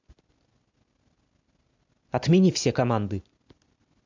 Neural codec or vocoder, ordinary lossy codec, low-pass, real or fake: none; MP3, 64 kbps; 7.2 kHz; real